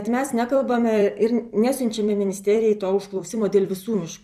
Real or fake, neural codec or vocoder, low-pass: fake; vocoder, 44.1 kHz, 128 mel bands every 256 samples, BigVGAN v2; 14.4 kHz